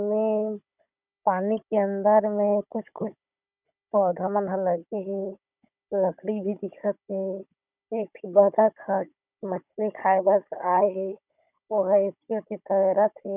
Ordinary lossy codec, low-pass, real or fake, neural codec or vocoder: none; 3.6 kHz; fake; codec, 16 kHz, 16 kbps, FunCodec, trained on Chinese and English, 50 frames a second